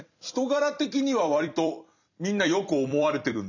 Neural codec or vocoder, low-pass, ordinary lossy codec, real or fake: vocoder, 44.1 kHz, 128 mel bands every 256 samples, BigVGAN v2; 7.2 kHz; none; fake